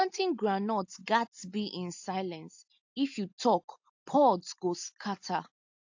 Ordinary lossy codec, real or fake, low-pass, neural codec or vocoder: AAC, 48 kbps; real; 7.2 kHz; none